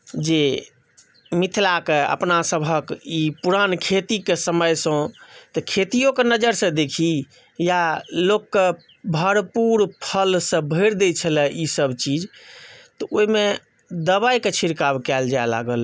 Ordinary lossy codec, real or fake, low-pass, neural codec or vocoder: none; real; none; none